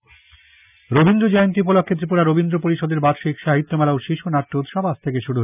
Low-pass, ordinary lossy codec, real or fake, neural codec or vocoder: 3.6 kHz; none; real; none